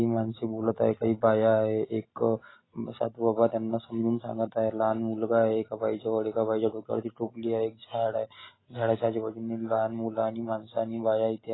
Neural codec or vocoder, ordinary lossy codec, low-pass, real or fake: none; AAC, 16 kbps; 7.2 kHz; real